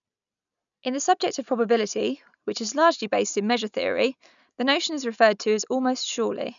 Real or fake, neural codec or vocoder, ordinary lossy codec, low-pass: real; none; none; 7.2 kHz